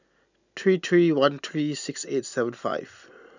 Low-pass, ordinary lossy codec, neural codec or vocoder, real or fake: 7.2 kHz; none; none; real